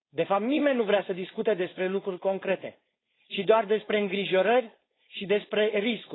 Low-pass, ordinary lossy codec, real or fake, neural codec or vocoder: 7.2 kHz; AAC, 16 kbps; fake; codec, 16 kHz, 4.8 kbps, FACodec